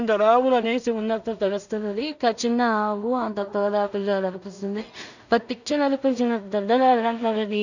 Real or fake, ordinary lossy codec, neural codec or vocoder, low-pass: fake; none; codec, 16 kHz in and 24 kHz out, 0.4 kbps, LongCat-Audio-Codec, two codebook decoder; 7.2 kHz